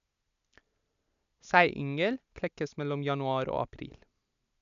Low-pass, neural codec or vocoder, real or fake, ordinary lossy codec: 7.2 kHz; none; real; none